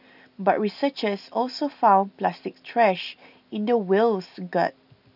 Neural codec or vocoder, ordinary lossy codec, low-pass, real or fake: none; none; 5.4 kHz; real